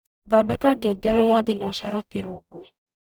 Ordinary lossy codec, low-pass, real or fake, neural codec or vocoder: none; none; fake; codec, 44.1 kHz, 0.9 kbps, DAC